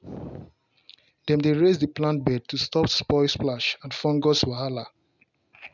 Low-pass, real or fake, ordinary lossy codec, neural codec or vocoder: 7.2 kHz; real; none; none